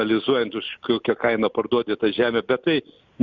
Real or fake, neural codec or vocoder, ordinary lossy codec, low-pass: real; none; Opus, 64 kbps; 7.2 kHz